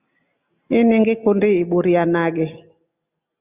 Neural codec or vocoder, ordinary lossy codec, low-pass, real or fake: none; Opus, 64 kbps; 3.6 kHz; real